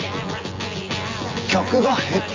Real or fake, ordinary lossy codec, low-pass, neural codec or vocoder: fake; Opus, 32 kbps; 7.2 kHz; vocoder, 24 kHz, 100 mel bands, Vocos